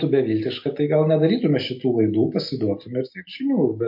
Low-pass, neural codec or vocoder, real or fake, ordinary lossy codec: 5.4 kHz; none; real; MP3, 32 kbps